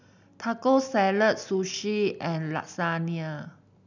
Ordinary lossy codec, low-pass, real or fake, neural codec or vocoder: none; 7.2 kHz; real; none